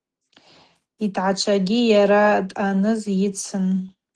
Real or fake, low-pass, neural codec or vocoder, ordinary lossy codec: real; 10.8 kHz; none; Opus, 16 kbps